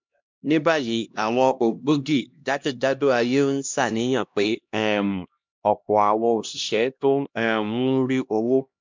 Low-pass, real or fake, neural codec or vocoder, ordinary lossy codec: 7.2 kHz; fake; codec, 16 kHz, 1 kbps, X-Codec, HuBERT features, trained on LibriSpeech; MP3, 64 kbps